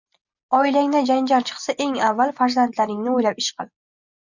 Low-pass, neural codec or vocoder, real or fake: 7.2 kHz; none; real